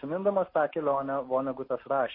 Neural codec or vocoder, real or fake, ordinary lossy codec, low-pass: none; real; MP3, 24 kbps; 5.4 kHz